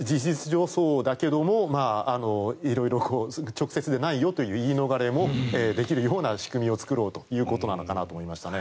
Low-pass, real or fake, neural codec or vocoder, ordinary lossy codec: none; real; none; none